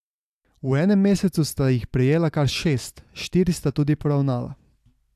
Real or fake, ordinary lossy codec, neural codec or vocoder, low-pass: real; AAC, 96 kbps; none; 14.4 kHz